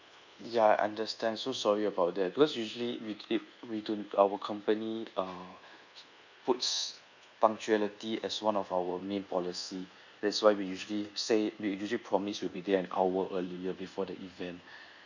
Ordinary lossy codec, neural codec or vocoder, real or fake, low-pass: none; codec, 24 kHz, 1.2 kbps, DualCodec; fake; 7.2 kHz